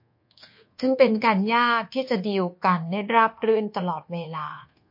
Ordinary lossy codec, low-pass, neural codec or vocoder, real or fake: MP3, 32 kbps; 5.4 kHz; codec, 24 kHz, 1.2 kbps, DualCodec; fake